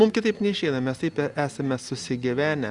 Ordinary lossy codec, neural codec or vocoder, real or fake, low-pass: Opus, 64 kbps; none; real; 10.8 kHz